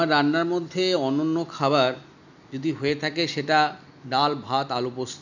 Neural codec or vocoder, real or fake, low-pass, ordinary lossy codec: none; real; 7.2 kHz; none